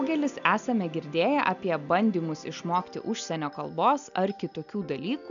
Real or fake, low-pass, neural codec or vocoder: real; 7.2 kHz; none